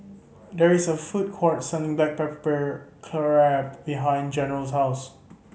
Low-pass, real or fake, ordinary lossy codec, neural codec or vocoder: none; real; none; none